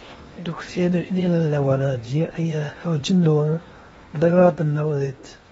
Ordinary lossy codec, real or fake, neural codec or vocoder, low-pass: AAC, 24 kbps; fake; codec, 16 kHz in and 24 kHz out, 0.8 kbps, FocalCodec, streaming, 65536 codes; 10.8 kHz